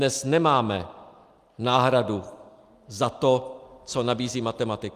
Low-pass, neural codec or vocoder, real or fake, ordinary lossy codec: 14.4 kHz; none; real; Opus, 32 kbps